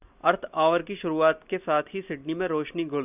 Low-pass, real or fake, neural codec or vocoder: 3.6 kHz; real; none